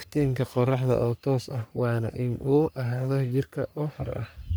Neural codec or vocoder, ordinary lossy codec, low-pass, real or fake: codec, 44.1 kHz, 3.4 kbps, Pupu-Codec; none; none; fake